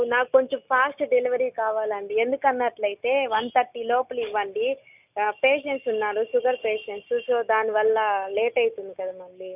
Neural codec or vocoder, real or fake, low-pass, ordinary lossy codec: none; real; 3.6 kHz; none